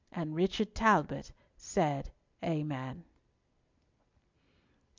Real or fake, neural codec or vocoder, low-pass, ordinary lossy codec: real; none; 7.2 kHz; MP3, 64 kbps